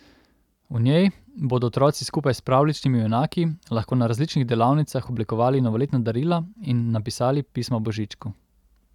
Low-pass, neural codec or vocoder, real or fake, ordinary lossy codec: 19.8 kHz; none; real; none